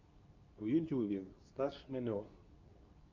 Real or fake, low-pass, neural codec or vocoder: fake; 7.2 kHz; codec, 24 kHz, 0.9 kbps, WavTokenizer, medium speech release version 2